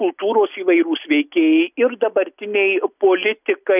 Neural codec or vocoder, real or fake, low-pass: none; real; 3.6 kHz